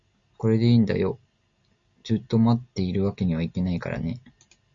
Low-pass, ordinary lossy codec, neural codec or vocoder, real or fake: 7.2 kHz; Opus, 64 kbps; none; real